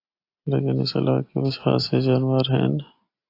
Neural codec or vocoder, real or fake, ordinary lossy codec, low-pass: none; real; AAC, 32 kbps; 5.4 kHz